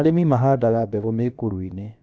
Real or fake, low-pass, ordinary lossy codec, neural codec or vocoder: fake; none; none; codec, 16 kHz, about 1 kbps, DyCAST, with the encoder's durations